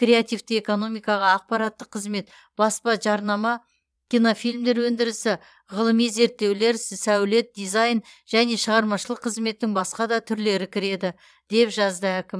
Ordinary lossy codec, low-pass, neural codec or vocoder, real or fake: none; none; vocoder, 22.05 kHz, 80 mel bands, Vocos; fake